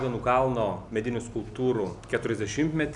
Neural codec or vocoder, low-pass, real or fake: none; 10.8 kHz; real